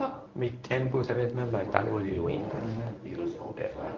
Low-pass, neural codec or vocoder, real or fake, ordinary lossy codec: 7.2 kHz; codec, 24 kHz, 0.9 kbps, WavTokenizer, medium speech release version 1; fake; Opus, 32 kbps